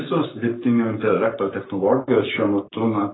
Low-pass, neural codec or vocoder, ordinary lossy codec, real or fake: 7.2 kHz; codec, 44.1 kHz, 7.8 kbps, Pupu-Codec; AAC, 16 kbps; fake